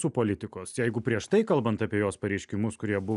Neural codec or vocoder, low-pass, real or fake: none; 10.8 kHz; real